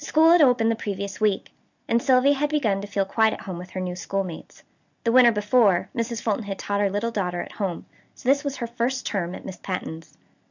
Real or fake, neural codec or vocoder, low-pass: real; none; 7.2 kHz